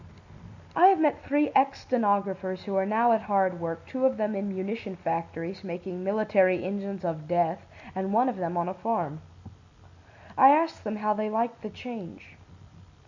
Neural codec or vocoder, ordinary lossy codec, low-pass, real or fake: none; Opus, 64 kbps; 7.2 kHz; real